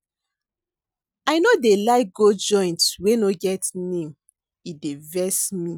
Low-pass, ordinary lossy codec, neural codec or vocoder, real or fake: none; none; none; real